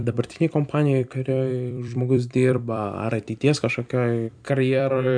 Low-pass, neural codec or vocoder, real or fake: 9.9 kHz; vocoder, 44.1 kHz, 128 mel bands every 256 samples, BigVGAN v2; fake